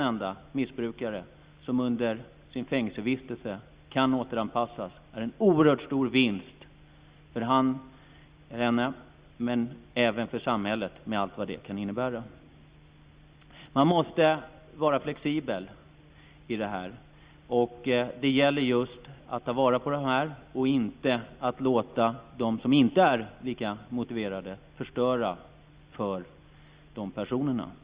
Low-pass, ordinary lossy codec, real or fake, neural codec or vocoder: 3.6 kHz; Opus, 64 kbps; real; none